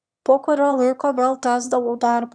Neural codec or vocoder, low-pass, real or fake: autoencoder, 22.05 kHz, a latent of 192 numbers a frame, VITS, trained on one speaker; 9.9 kHz; fake